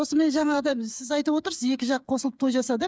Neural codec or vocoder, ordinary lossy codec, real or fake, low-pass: codec, 16 kHz, 8 kbps, FreqCodec, smaller model; none; fake; none